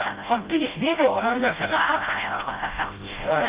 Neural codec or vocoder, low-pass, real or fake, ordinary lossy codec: codec, 16 kHz, 0.5 kbps, FreqCodec, smaller model; 3.6 kHz; fake; Opus, 32 kbps